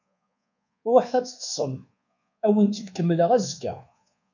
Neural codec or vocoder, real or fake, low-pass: codec, 24 kHz, 1.2 kbps, DualCodec; fake; 7.2 kHz